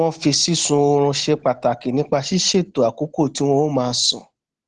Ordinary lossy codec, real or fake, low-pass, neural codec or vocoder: Opus, 16 kbps; real; 10.8 kHz; none